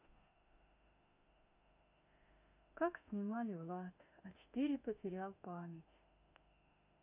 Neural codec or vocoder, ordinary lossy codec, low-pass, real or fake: autoencoder, 48 kHz, 32 numbers a frame, DAC-VAE, trained on Japanese speech; none; 3.6 kHz; fake